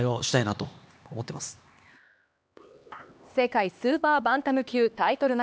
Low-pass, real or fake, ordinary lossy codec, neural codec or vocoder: none; fake; none; codec, 16 kHz, 2 kbps, X-Codec, HuBERT features, trained on LibriSpeech